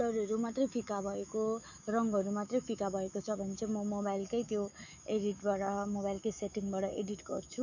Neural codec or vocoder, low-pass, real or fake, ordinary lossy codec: autoencoder, 48 kHz, 128 numbers a frame, DAC-VAE, trained on Japanese speech; 7.2 kHz; fake; none